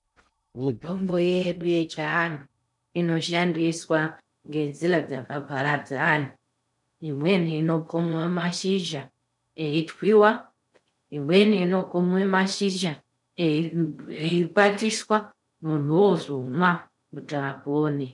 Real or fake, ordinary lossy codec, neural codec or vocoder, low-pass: fake; MP3, 96 kbps; codec, 16 kHz in and 24 kHz out, 0.6 kbps, FocalCodec, streaming, 2048 codes; 10.8 kHz